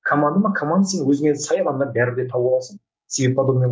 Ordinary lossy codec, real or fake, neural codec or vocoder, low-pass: none; fake; codec, 16 kHz, 6 kbps, DAC; none